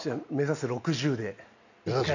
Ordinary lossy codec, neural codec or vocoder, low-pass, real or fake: none; none; 7.2 kHz; real